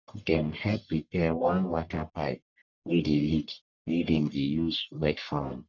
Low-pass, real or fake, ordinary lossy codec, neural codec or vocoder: 7.2 kHz; fake; none; codec, 44.1 kHz, 1.7 kbps, Pupu-Codec